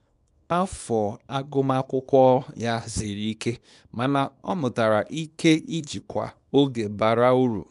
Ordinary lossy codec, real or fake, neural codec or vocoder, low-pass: none; fake; codec, 24 kHz, 0.9 kbps, WavTokenizer, small release; 10.8 kHz